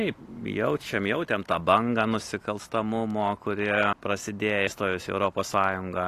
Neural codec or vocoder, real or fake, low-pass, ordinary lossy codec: none; real; 14.4 kHz; AAC, 48 kbps